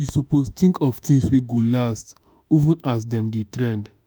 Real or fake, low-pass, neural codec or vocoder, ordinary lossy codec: fake; none; autoencoder, 48 kHz, 32 numbers a frame, DAC-VAE, trained on Japanese speech; none